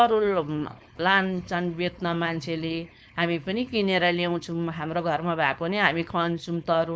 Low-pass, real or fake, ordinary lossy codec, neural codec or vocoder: none; fake; none; codec, 16 kHz, 4.8 kbps, FACodec